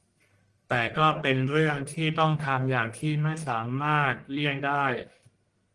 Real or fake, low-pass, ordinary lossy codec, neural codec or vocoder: fake; 10.8 kHz; Opus, 24 kbps; codec, 44.1 kHz, 1.7 kbps, Pupu-Codec